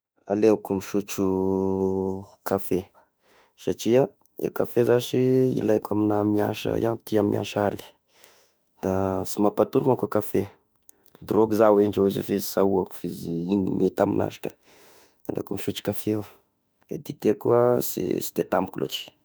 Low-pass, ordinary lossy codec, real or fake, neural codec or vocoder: none; none; fake; autoencoder, 48 kHz, 32 numbers a frame, DAC-VAE, trained on Japanese speech